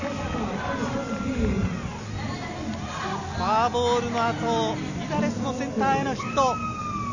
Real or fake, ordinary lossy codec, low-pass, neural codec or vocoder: real; none; 7.2 kHz; none